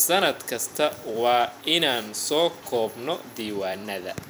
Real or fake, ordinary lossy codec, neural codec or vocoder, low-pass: fake; none; vocoder, 44.1 kHz, 128 mel bands every 256 samples, BigVGAN v2; none